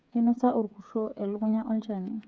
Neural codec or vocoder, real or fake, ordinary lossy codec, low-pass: codec, 16 kHz, 8 kbps, FreqCodec, smaller model; fake; none; none